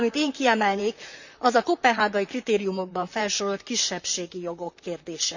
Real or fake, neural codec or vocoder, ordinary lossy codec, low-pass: fake; vocoder, 44.1 kHz, 128 mel bands, Pupu-Vocoder; none; 7.2 kHz